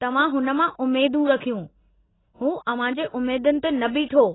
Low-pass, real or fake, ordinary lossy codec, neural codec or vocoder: 7.2 kHz; real; AAC, 16 kbps; none